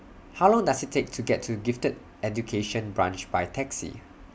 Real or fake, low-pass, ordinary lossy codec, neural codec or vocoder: real; none; none; none